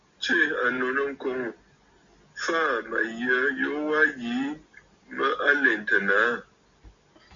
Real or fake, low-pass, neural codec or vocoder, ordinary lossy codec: real; 7.2 kHz; none; Opus, 64 kbps